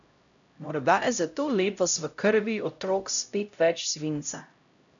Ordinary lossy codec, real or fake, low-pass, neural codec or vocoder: none; fake; 7.2 kHz; codec, 16 kHz, 0.5 kbps, X-Codec, HuBERT features, trained on LibriSpeech